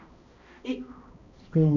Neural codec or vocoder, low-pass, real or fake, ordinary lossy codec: codec, 16 kHz, 1 kbps, X-Codec, HuBERT features, trained on balanced general audio; 7.2 kHz; fake; none